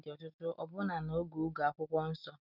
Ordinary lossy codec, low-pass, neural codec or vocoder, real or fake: none; 5.4 kHz; none; real